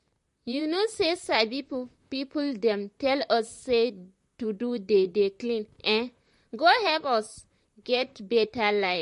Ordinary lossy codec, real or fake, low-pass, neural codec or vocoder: MP3, 48 kbps; fake; 14.4 kHz; vocoder, 44.1 kHz, 128 mel bands, Pupu-Vocoder